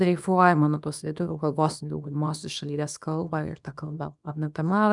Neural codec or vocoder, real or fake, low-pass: codec, 24 kHz, 0.9 kbps, WavTokenizer, small release; fake; 10.8 kHz